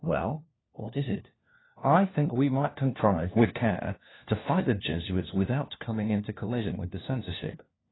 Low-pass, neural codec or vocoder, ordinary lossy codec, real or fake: 7.2 kHz; codec, 16 kHz, 1 kbps, FunCodec, trained on LibriTTS, 50 frames a second; AAC, 16 kbps; fake